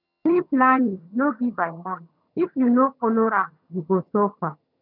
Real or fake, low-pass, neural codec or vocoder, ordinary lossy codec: fake; 5.4 kHz; vocoder, 22.05 kHz, 80 mel bands, HiFi-GAN; none